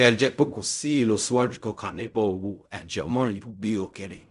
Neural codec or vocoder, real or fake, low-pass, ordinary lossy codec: codec, 16 kHz in and 24 kHz out, 0.4 kbps, LongCat-Audio-Codec, fine tuned four codebook decoder; fake; 10.8 kHz; none